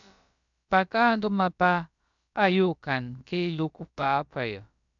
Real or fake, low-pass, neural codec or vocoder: fake; 7.2 kHz; codec, 16 kHz, about 1 kbps, DyCAST, with the encoder's durations